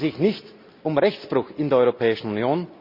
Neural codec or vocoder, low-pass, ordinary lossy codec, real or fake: none; 5.4 kHz; Opus, 64 kbps; real